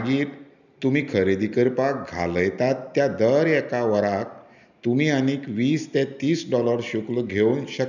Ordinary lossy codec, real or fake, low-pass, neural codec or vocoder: none; real; 7.2 kHz; none